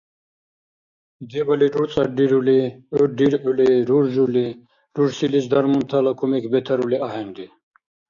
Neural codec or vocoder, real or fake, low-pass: codec, 16 kHz, 6 kbps, DAC; fake; 7.2 kHz